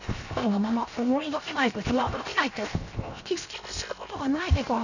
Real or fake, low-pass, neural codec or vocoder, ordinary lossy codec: fake; 7.2 kHz; codec, 16 kHz, 0.7 kbps, FocalCodec; none